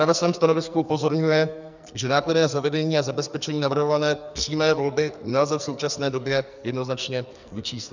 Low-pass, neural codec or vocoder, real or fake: 7.2 kHz; codec, 44.1 kHz, 2.6 kbps, SNAC; fake